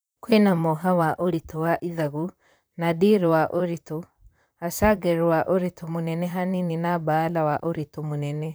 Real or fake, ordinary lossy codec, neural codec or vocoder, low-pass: fake; none; vocoder, 44.1 kHz, 128 mel bands, Pupu-Vocoder; none